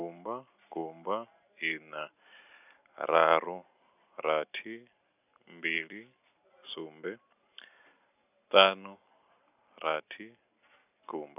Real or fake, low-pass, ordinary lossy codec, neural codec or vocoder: real; 3.6 kHz; none; none